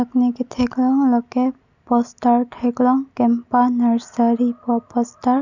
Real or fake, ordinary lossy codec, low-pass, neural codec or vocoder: real; none; 7.2 kHz; none